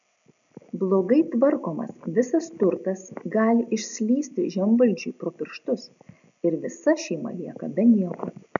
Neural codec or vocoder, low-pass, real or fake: none; 7.2 kHz; real